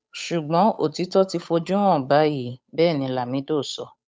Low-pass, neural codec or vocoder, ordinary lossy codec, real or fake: none; codec, 16 kHz, 8 kbps, FunCodec, trained on Chinese and English, 25 frames a second; none; fake